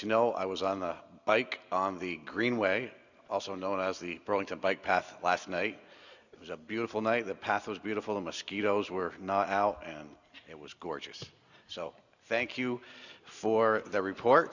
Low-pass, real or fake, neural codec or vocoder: 7.2 kHz; real; none